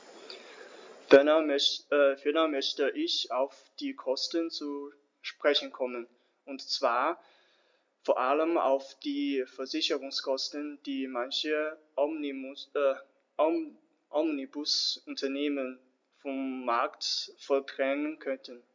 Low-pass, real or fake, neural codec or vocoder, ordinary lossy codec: 7.2 kHz; real; none; MP3, 64 kbps